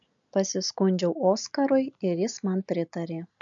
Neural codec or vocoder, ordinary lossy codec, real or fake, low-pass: none; MP3, 64 kbps; real; 7.2 kHz